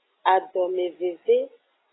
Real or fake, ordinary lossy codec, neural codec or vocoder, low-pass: real; AAC, 16 kbps; none; 7.2 kHz